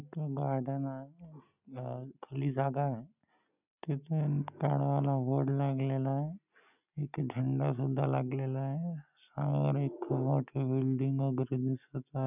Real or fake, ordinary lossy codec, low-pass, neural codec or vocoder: real; none; 3.6 kHz; none